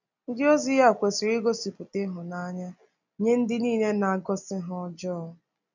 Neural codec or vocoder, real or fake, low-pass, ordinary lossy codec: none; real; 7.2 kHz; none